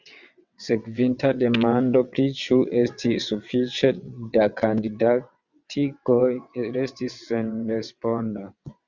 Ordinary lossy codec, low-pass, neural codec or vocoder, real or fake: Opus, 64 kbps; 7.2 kHz; vocoder, 22.05 kHz, 80 mel bands, WaveNeXt; fake